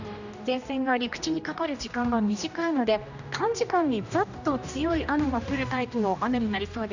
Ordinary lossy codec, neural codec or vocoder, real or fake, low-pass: none; codec, 16 kHz, 1 kbps, X-Codec, HuBERT features, trained on general audio; fake; 7.2 kHz